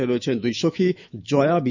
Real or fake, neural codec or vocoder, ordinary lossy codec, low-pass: fake; autoencoder, 48 kHz, 128 numbers a frame, DAC-VAE, trained on Japanese speech; none; 7.2 kHz